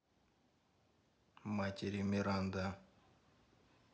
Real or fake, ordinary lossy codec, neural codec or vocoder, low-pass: real; none; none; none